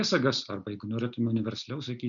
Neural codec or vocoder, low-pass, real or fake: none; 7.2 kHz; real